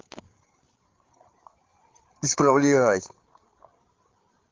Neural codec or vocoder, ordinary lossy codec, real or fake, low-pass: codec, 16 kHz, 8 kbps, FreqCodec, larger model; Opus, 16 kbps; fake; 7.2 kHz